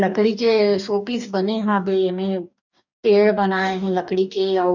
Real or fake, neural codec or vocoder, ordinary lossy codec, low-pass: fake; codec, 44.1 kHz, 2.6 kbps, DAC; none; 7.2 kHz